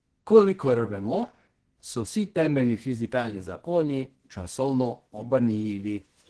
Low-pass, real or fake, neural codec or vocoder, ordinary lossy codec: 10.8 kHz; fake; codec, 24 kHz, 0.9 kbps, WavTokenizer, medium music audio release; Opus, 16 kbps